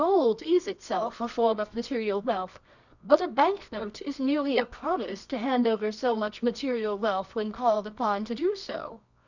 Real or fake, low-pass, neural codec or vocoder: fake; 7.2 kHz; codec, 24 kHz, 0.9 kbps, WavTokenizer, medium music audio release